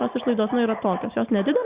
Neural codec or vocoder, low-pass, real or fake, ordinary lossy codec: none; 3.6 kHz; real; Opus, 64 kbps